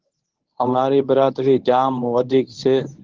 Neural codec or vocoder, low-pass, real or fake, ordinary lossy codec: codec, 24 kHz, 0.9 kbps, WavTokenizer, medium speech release version 1; 7.2 kHz; fake; Opus, 16 kbps